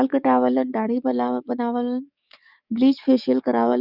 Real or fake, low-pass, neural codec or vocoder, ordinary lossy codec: fake; 5.4 kHz; codec, 44.1 kHz, 7.8 kbps, DAC; none